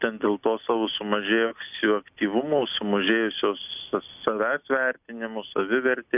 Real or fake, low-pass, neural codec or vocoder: real; 3.6 kHz; none